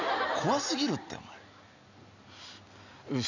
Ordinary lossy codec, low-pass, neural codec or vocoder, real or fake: none; 7.2 kHz; none; real